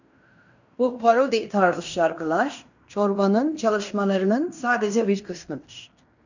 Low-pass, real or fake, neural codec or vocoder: 7.2 kHz; fake; codec, 16 kHz in and 24 kHz out, 0.9 kbps, LongCat-Audio-Codec, fine tuned four codebook decoder